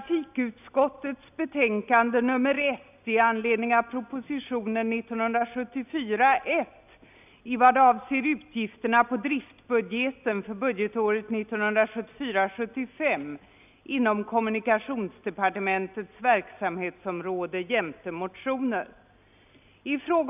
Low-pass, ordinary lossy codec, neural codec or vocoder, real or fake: 3.6 kHz; none; none; real